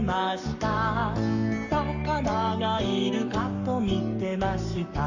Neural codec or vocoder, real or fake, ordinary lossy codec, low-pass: codec, 44.1 kHz, 7.8 kbps, Pupu-Codec; fake; none; 7.2 kHz